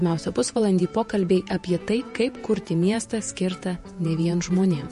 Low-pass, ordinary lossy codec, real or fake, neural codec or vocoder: 14.4 kHz; MP3, 48 kbps; fake; vocoder, 48 kHz, 128 mel bands, Vocos